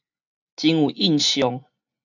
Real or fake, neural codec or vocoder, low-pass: real; none; 7.2 kHz